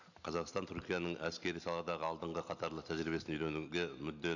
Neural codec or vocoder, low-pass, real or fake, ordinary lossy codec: none; 7.2 kHz; real; none